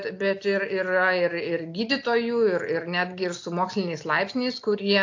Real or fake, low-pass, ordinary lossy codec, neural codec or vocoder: real; 7.2 kHz; AAC, 48 kbps; none